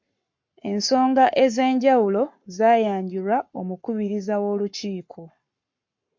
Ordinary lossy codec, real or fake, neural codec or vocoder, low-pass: MP3, 48 kbps; fake; codec, 44.1 kHz, 7.8 kbps, Pupu-Codec; 7.2 kHz